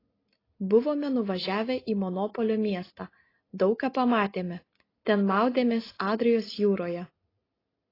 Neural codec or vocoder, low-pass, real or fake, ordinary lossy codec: none; 5.4 kHz; real; AAC, 24 kbps